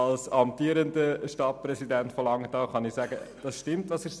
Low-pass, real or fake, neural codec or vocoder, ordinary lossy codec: none; real; none; none